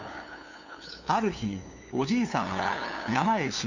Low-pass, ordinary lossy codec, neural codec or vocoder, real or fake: 7.2 kHz; none; codec, 16 kHz, 2 kbps, FunCodec, trained on LibriTTS, 25 frames a second; fake